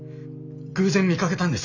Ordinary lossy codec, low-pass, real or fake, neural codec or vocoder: none; 7.2 kHz; real; none